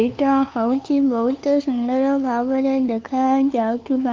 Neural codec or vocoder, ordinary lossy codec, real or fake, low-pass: codec, 16 kHz, 2 kbps, FunCodec, trained on LibriTTS, 25 frames a second; Opus, 32 kbps; fake; 7.2 kHz